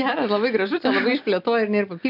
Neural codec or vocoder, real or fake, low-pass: none; real; 5.4 kHz